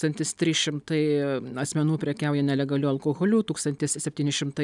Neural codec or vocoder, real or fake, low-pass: none; real; 10.8 kHz